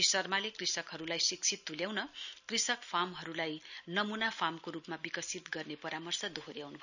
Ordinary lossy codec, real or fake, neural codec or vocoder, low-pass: none; real; none; 7.2 kHz